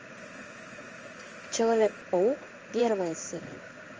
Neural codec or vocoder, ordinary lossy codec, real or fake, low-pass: codec, 16 kHz in and 24 kHz out, 1 kbps, XY-Tokenizer; Opus, 24 kbps; fake; 7.2 kHz